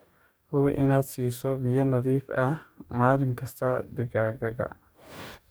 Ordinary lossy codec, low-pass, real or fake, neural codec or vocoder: none; none; fake; codec, 44.1 kHz, 2.6 kbps, DAC